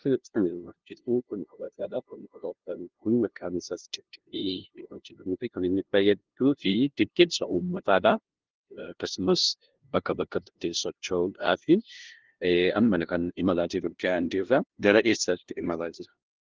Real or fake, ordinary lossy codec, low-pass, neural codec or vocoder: fake; Opus, 16 kbps; 7.2 kHz; codec, 16 kHz, 0.5 kbps, FunCodec, trained on LibriTTS, 25 frames a second